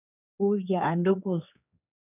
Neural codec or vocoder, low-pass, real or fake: codec, 16 kHz, 4 kbps, X-Codec, HuBERT features, trained on general audio; 3.6 kHz; fake